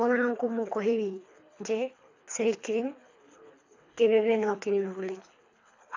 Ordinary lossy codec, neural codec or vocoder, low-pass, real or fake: AAC, 48 kbps; codec, 24 kHz, 3 kbps, HILCodec; 7.2 kHz; fake